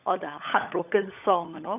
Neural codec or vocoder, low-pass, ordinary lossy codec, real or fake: codec, 16 kHz, 16 kbps, FunCodec, trained on LibriTTS, 50 frames a second; 3.6 kHz; none; fake